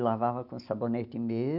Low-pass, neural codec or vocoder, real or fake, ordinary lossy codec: 5.4 kHz; none; real; none